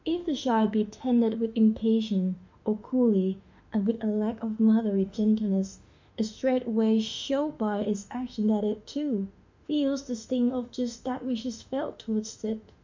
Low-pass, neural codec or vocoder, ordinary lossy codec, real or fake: 7.2 kHz; autoencoder, 48 kHz, 32 numbers a frame, DAC-VAE, trained on Japanese speech; MP3, 64 kbps; fake